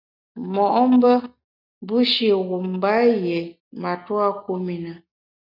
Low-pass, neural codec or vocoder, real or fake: 5.4 kHz; none; real